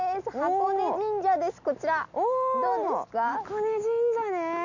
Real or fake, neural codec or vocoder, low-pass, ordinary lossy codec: real; none; 7.2 kHz; none